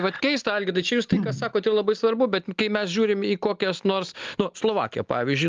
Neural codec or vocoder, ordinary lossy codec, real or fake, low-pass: none; Opus, 32 kbps; real; 7.2 kHz